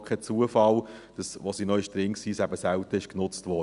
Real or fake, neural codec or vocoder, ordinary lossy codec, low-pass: real; none; none; 10.8 kHz